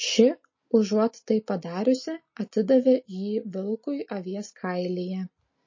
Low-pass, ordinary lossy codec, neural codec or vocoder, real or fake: 7.2 kHz; MP3, 32 kbps; none; real